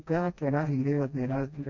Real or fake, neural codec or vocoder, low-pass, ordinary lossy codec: fake; codec, 16 kHz, 1 kbps, FreqCodec, smaller model; 7.2 kHz; none